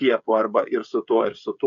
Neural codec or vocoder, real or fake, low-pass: codec, 16 kHz, 16 kbps, FreqCodec, smaller model; fake; 7.2 kHz